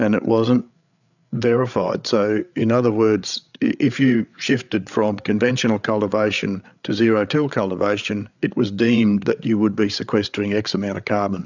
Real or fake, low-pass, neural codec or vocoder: fake; 7.2 kHz; codec, 16 kHz, 8 kbps, FreqCodec, larger model